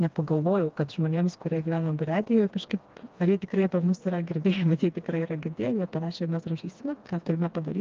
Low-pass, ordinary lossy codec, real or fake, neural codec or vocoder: 7.2 kHz; Opus, 24 kbps; fake; codec, 16 kHz, 2 kbps, FreqCodec, smaller model